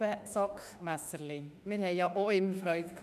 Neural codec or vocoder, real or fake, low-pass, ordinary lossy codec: autoencoder, 48 kHz, 32 numbers a frame, DAC-VAE, trained on Japanese speech; fake; 14.4 kHz; none